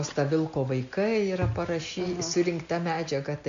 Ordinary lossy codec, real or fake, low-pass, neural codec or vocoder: MP3, 64 kbps; real; 7.2 kHz; none